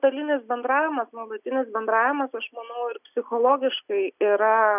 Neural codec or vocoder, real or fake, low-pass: none; real; 3.6 kHz